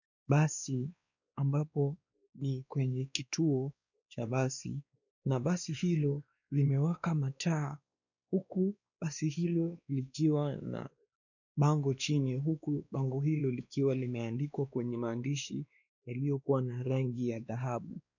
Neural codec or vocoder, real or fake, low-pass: codec, 16 kHz, 2 kbps, X-Codec, WavLM features, trained on Multilingual LibriSpeech; fake; 7.2 kHz